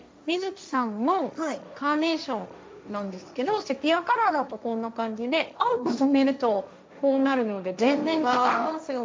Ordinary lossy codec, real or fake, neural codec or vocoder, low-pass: none; fake; codec, 16 kHz, 1.1 kbps, Voila-Tokenizer; none